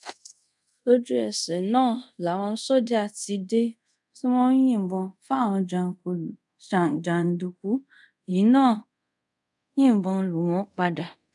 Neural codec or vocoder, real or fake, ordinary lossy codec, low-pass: codec, 24 kHz, 0.5 kbps, DualCodec; fake; none; 10.8 kHz